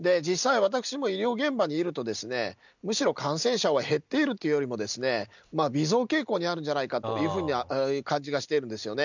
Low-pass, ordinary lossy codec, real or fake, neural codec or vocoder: 7.2 kHz; none; real; none